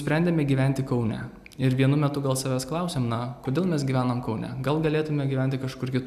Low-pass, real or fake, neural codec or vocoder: 14.4 kHz; real; none